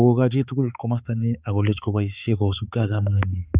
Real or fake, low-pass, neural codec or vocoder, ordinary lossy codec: fake; 3.6 kHz; codec, 16 kHz, 4 kbps, X-Codec, HuBERT features, trained on balanced general audio; Opus, 64 kbps